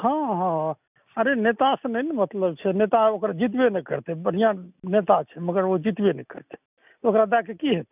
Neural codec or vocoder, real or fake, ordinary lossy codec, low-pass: none; real; none; 3.6 kHz